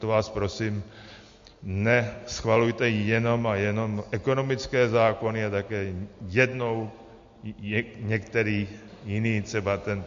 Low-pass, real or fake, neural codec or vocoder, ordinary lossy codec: 7.2 kHz; real; none; MP3, 48 kbps